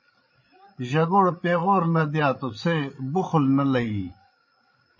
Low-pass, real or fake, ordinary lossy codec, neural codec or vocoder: 7.2 kHz; fake; MP3, 32 kbps; codec, 16 kHz, 8 kbps, FreqCodec, larger model